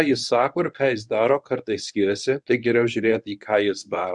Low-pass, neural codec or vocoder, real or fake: 10.8 kHz; codec, 24 kHz, 0.9 kbps, WavTokenizer, medium speech release version 1; fake